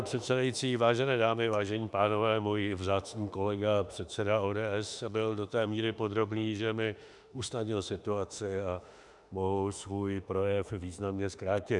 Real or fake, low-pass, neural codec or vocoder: fake; 10.8 kHz; autoencoder, 48 kHz, 32 numbers a frame, DAC-VAE, trained on Japanese speech